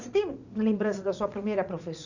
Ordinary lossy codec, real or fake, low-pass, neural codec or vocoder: none; fake; 7.2 kHz; vocoder, 44.1 kHz, 80 mel bands, Vocos